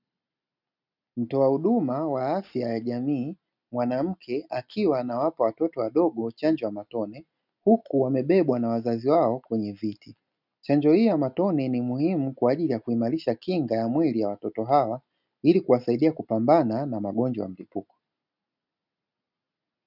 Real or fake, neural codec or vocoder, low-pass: real; none; 5.4 kHz